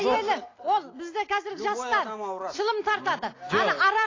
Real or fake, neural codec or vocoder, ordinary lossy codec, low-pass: real; none; AAC, 32 kbps; 7.2 kHz